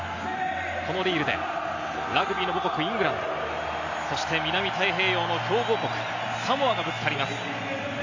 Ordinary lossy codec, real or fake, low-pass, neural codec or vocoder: AAC, 48 kbps; real; 7.2 kHz; none